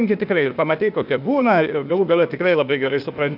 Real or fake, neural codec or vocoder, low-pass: fake; codec, 16 kHz, 0.8 kbps, ZipCodec; 5.4 kHz